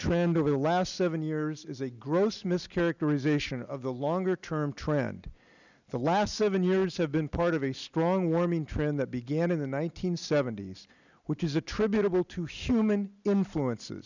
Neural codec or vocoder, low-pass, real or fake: none; 7.2 kHz; real